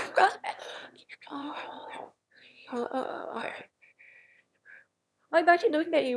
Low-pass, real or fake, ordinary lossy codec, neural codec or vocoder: none; fake; none; autoencoder, 22.05 kHz, a latent of 192 numbers a frame, VITS, trained on one speaker